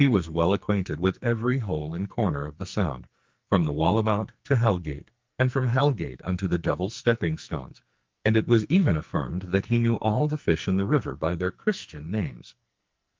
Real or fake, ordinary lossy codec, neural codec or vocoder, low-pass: fake; Opus, 32 kbps; codec, 44.1 kHz, 2.6 kbps, SNAC; 7.2 kHz